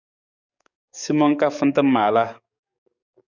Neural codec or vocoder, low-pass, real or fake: codec, 44.1 kHz, 7.8 kbps, DAC; 7.2 kHz; fake